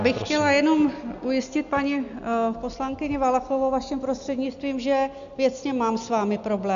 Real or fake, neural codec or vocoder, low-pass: real; none; 7.2 kHz